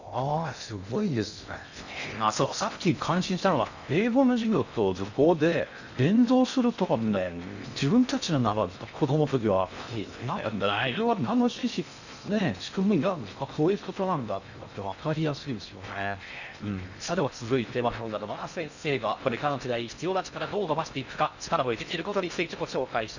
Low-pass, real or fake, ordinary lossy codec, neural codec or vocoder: 7.2 kHz; fake; none; codec, 16 kHz in and 24 kHz out, 0.6 kbps, FocalCodec, streaming, 2048 codes